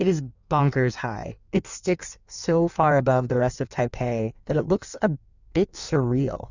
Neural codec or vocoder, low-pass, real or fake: codec, 16 kHz in and 24 kHz out, 1.1 kbps, FireRedTTS-2 codec; 7.2 kHz; fake